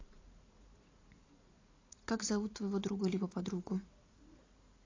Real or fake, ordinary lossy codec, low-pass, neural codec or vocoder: fake; AAC, 32 kbps; 7.2 kHz; vocoder, 44.1 kHz, 80 mel bands, Vocos